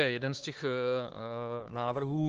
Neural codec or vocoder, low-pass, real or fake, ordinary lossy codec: codec, 16 kHz, 4 kbps, X-Codec, HuBERT features, trained on LibriSpeech; 7.2 kHz; fake; Opus, 16 kbps